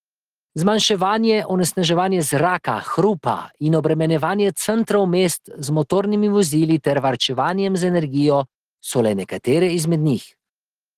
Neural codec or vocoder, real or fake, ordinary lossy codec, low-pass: none; real; Opus, 16 kbps; 14.4 kHz